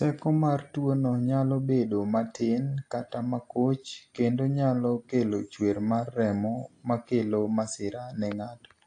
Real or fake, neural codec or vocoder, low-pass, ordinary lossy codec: real; none; 9.9 kHz; AAC, 48 kbps